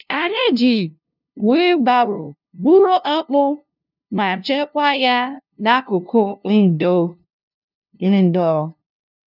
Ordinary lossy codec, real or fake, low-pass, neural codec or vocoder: none; fake; 5.4 kHz; codec, 16 kHz, 0.5 kbps, FunCodec, trained on LibriTTS, 25 frames a second